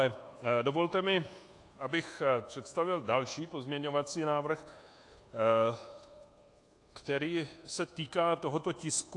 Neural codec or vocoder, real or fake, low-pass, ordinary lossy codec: codec, 24 kHz, 1.2 kbps, DualCodec; fake; 10.8 kHz; AAC, 48 kbps